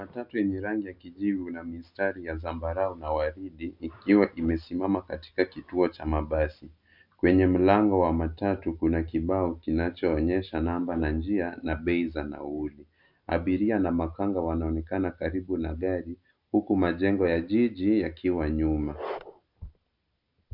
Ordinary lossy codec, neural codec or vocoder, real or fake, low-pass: MP3, 48 kbps; none; real; 5.4 kHz